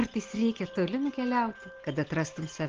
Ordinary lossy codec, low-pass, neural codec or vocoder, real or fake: Opus, 16 kbps; 7.2 kHz; none; real